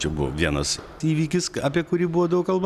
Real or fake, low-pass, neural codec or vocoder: real; 14.4 kHz; none